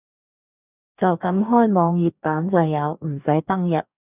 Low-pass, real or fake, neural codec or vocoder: 3.6 kHz; fake; codec, 44.1 kHz, 2.6 kbps, DAC